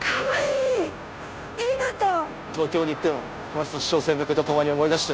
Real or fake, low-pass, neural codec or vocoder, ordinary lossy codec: fake; none; codec, 16 kHz, 0.5 kbps, FunCodec, trained on Chinese and English, 25 frames a second; none